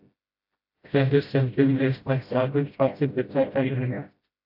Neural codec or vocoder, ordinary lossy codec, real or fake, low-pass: codec, 16 kHz, 0.5 kbps, FreqCodec, smaller model; AAC, 48 kbps; fake; 5.4 kHz